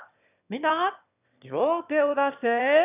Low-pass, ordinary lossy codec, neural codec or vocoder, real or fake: 3.6 kHz; none; autoencoder, 22.05 kHz, a latent of 192 numbers a frame, VITS, trained on one speaker; fake